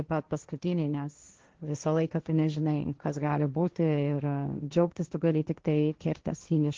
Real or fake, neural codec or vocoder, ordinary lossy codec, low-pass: fake; codec, 16 kHz, 1.1 kbps, Voila-Tokenizer; Opus, 16 kbps; 7.2 kHz